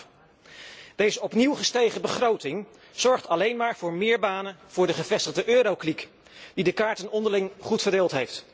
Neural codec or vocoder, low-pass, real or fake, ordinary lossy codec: none; none; real; none